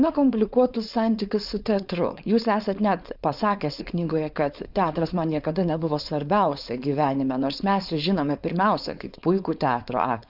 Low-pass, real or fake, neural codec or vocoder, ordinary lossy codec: 5.4 kHz; fake; codec, 16 kHz, 4.8 kbps, FACodec; Opus, 64 kbps